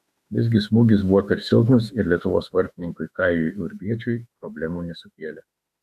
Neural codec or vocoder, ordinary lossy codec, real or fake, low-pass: autoencoder, 48 kHz, 32 numbers a frame, DAC-VAE, trained on Japanese speech; AAC, 96 kbps; fake; 14.4 kHz